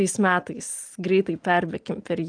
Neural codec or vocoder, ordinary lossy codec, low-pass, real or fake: none; Opus, 32 kbps; 9.9 kHz; real